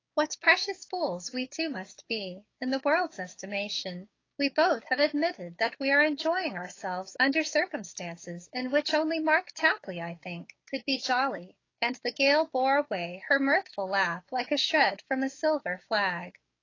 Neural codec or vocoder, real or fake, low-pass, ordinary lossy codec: codec, 16 kHz, 6 kbps, DAC; fake; 7.2 kHz; AAC, 32 kbps